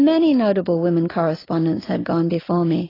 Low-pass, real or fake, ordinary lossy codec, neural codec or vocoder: 5.4 kHz; real; AAC, 24 kbps; none